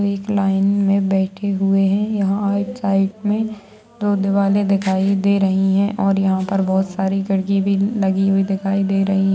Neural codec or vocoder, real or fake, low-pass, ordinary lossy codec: none; real; none; none